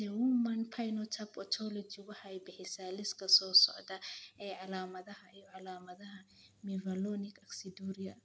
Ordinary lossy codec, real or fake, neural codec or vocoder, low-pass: none; real; none; none